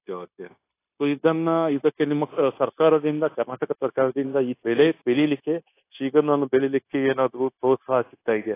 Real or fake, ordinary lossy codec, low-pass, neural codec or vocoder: fake; AAC, 24 kbps; 3.6 kHz; codec, 16 kHz, 0.9 kbps, LongCat-Audio-Codec